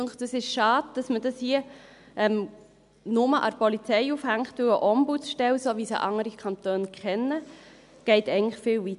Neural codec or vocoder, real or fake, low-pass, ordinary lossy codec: none; real; 10.8 kHz; none